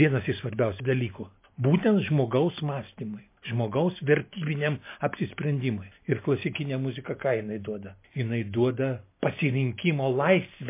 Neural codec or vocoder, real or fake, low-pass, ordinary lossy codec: none; real; 3.6 kHz; MP3, 24 kbps